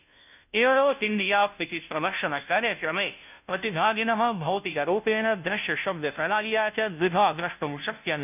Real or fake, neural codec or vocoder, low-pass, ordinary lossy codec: fake; codec, 16 kHz, 0.5 kbps, FunCodec, trained on Chinese and English, 25 frames a second; 3.6 kHz; none